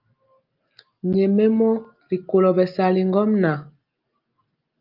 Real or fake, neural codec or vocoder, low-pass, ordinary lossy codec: real; none; 5.4 kHz; Opus, 24 kbps